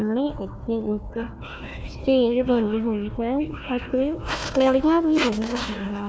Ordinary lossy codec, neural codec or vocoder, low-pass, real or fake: none; codec, 16 kHz, 1 kbps, FunCodec, trained on Chinese and English, 50 frames a second; none; fake